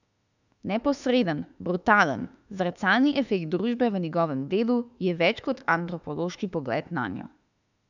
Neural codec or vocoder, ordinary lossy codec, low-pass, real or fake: autoencoder, 48 kHz, 32 numbers a frame, DAC-VAE, trained on Japanese speech; none; 7.2 kHz; fake